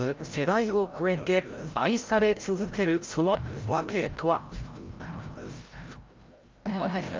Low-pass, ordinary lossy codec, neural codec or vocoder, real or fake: 7.2 kHz; Opus, 32 kbps; codec, 16 kHz, 0.5 kbps, FreqCodec, larger model; fake